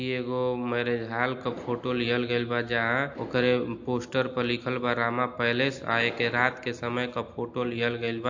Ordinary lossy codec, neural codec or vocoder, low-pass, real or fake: AAC, 48 kbps; none; 7.2 kHz; real